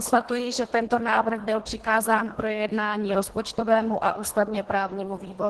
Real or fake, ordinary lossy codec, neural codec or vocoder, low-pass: fake; Opus, 16 kbps; codec, 24 kHz, 1.5 kbps, HILCodec; 10.8 kHz